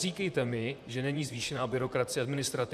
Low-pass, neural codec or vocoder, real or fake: 14.4 kHz; vocoder, 44.1 kHz, 128 mel bands, Pupu-Vocoder; fake